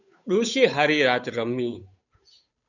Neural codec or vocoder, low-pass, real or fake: codec, 16 kHz, 8 kbps, FunCodec, trained on Chinese and English, 25 frames a second; 7.2 kHz; fake